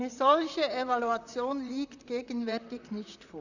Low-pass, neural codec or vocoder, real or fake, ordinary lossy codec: 7.2 kHz; vocoder, 44.1 kHz, 128 mel bands, Pupu-Vocoder; fake; none